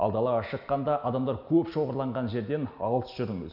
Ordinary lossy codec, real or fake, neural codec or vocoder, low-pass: none; real; none; 5.4 kHz